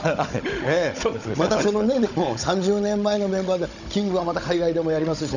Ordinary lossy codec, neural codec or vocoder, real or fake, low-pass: none; codec, 16 kHz, 16 kbps, FunCodec, trained on Chinese and English, 50 frames a second; fake; 7.2 kHz